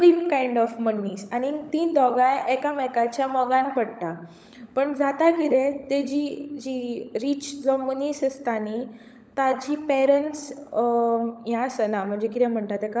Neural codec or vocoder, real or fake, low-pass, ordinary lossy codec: codec, 16 kHz, 16 kbps, FunCodec, trained on LibriTTS, 50 frames a second; fake; none; none